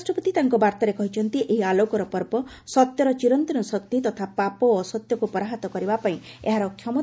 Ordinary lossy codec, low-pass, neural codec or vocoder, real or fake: none; none; none; real